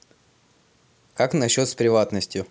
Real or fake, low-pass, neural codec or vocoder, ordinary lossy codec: real; none; none; none